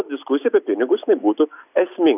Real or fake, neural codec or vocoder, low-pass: real; none; 3.6 kHz